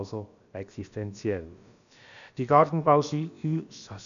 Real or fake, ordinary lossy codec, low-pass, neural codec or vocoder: fake; none; 7.2 kHz; codec, 16 kHz, about 1 kbps, DyCAST, with the encoder's durations